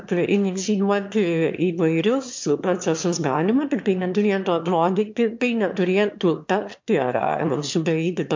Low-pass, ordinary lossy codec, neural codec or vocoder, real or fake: 7.2 kHz; MP3, 48 kbps; autoencoder, 22.05 kHz, a latent of 192 numbers a frame, VITS, trained on one speaker; fake